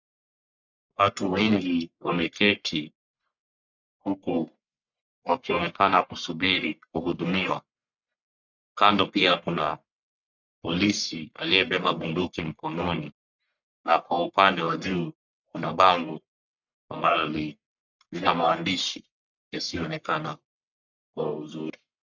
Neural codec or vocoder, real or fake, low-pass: codec, 44.1 kHz, 1.7 kbps, Pupu-Codec; fake; 7.2 kHz